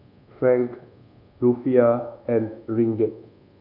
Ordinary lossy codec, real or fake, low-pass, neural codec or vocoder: none; fake; 5.4 kHz; codec, 24 kHz, 1.2 kbps, DualCodec